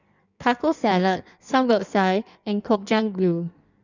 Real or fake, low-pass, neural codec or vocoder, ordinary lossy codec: fake; 7.2 kHz; codec, 16 kHz in and 24 kHz out, 1.1 kbps, FireRedTTS-2 codec; none